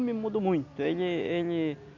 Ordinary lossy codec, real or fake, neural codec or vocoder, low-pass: none; real; none; 7.2 kHz